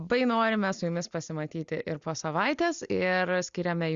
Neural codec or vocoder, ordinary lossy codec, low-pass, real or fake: none; Opus, 64 kbps; 7.2 kHz; real